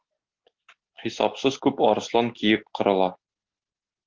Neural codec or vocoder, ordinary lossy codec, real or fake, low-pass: none; Opus, 16 kbps; real; 7.2 kHz